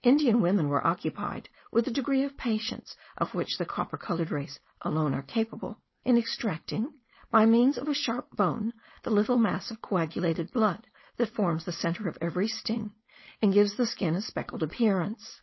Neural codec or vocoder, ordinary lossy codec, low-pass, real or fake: codec, 16 kHz, 4.8 kbps, FACodec; MP3, 24 kbps; 7.2 kHz; fake